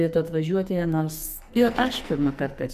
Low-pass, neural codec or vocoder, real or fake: 14.4 kHz; codec, 44.1 kHz, 2.6 kbps, SNAC; fake